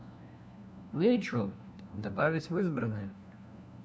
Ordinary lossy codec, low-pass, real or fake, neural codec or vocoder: none; none; fake; codec, 16 kHz, 1 kbps, FunCodec, trained on LibriTTS, 50 frames a second